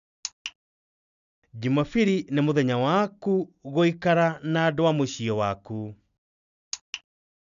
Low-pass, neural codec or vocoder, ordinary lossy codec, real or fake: 7.2 kHz; none; none; real